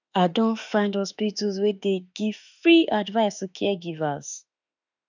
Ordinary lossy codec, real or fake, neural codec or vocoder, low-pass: none; fake; autoencoder, 48 kHz, 32 numbers a frame, DAC-VAE, trained on Japanese speech; 7.2 kHz